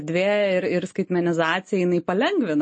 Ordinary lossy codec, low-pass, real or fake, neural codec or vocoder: MP3, 32 kbps; 10.8 kHz; real; none